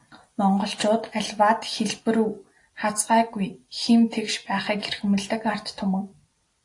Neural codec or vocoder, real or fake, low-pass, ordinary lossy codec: none; real; 10.8 kHz; AAC, 48 kbps